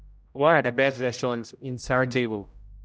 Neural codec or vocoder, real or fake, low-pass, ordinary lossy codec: codec, 16 kHz, 0.5 kbps, X-Codec, HuBERT features, trained on general audio; fake; none; none